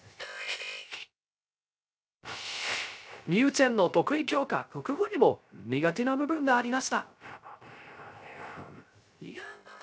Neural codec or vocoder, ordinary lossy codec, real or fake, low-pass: codec, 16 kHz, 0.3 kbps, FocalCodec; none; fake; none